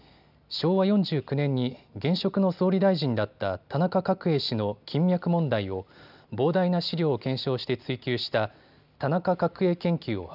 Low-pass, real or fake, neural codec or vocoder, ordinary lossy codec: 5.4 kHz; real; none; none